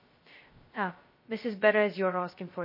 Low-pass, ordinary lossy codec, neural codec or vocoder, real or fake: 5.4 kHz; MP3, 24 kbps; codec, 16 kHz, 0.2 kbps, FocalCodec; fake